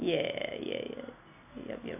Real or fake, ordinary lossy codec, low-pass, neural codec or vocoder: real; none; 3.6 kHz; none